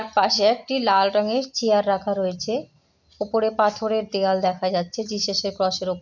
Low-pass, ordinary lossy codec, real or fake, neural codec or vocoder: 7.2 kHz; none; real; none